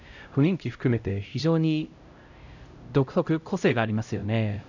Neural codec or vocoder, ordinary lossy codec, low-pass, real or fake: codec, 16 kHz, 0.5 kbps, X-Codec, HuBERT features, trained on LibriSpeech; none; 7.2 kHz; fake